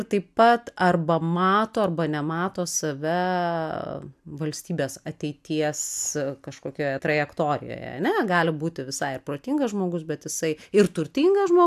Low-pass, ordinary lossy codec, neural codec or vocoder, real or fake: 14.4 kHz; AAC, 96 kbps; none; real